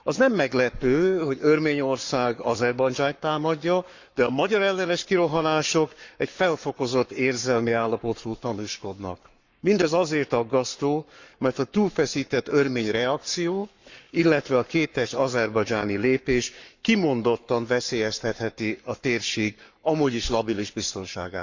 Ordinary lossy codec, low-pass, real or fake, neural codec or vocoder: Opus, 64 kbps; 7.2 kHz; fake; codec, 44.1 kHz, 7.8 kbps, Pupu-Codec